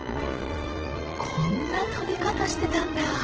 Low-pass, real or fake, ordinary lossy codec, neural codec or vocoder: 7.2 kHz; fake; Opus, 16 kbps; vocoder, 22.05 kHz, 80 mel bands, Vocos